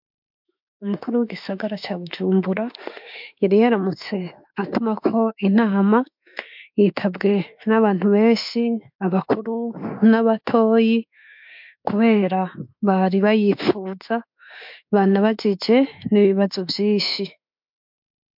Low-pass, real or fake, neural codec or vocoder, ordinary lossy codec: 5.4 kHz; fake; autoencoder, 48 kHz, 32 numbers a frame, DAC-VAE, trained on Japanese speech; MP3, 48 kbps